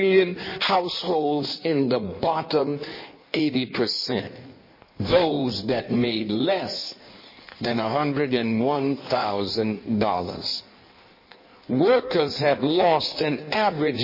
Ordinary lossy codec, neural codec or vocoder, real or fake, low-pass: MP3, 24 kbps; codec, 16 kHz in and 24 kHz out, 1.1 kbps, FireRedTTS-2 codec; fake; 5.4 kHz